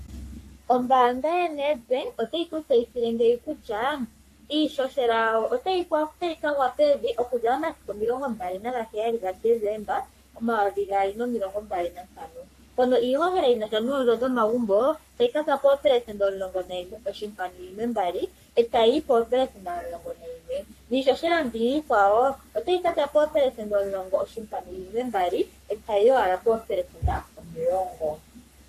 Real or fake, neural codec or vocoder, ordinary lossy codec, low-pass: fake; codec, 44.1 kHz, 3.4 kbps, Pupu-Codec; AAC, 64 kbps; 14.4 kHz